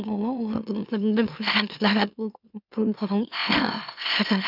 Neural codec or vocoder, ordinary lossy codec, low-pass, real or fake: autoencoder, 44.1 kHz, a latent of 192 numbers a frame, MeloTTS; none; 5.4 kHz; fake